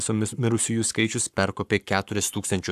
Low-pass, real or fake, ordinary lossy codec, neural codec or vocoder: 14.4 kHz; fake; AAC, 96 kbps; codec, 44.1 kHz, 7.8 kbps, Pupu-Codec